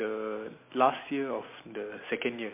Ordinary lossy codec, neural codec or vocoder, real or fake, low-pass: MP3, 24 kbps; none; real; 3.6 kHz